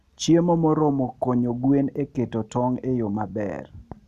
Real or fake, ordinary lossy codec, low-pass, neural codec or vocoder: real; none; 14.4 kHz; none